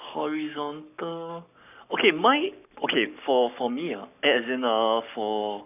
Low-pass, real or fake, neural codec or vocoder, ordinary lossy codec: 3.6 kHz; fake; codec, 44.1 kHz, 7.8 kbps, Pupu-Codec; none